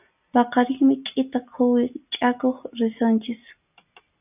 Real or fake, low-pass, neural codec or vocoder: real; 3.6 kHz; none